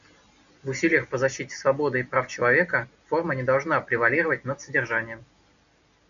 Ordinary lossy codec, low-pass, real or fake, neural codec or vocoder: MP3, 96 kbps; 7.2 kHz; real; none